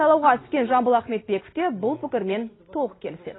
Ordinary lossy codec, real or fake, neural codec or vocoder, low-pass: AAC, 16 kbps; real; none; 7.2 kHz